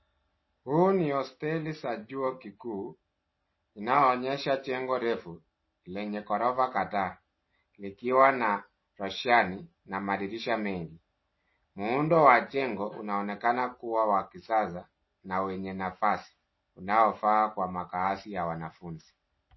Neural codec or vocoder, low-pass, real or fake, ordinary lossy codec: none; 7.2 kHz; real; MP3, 24 kbps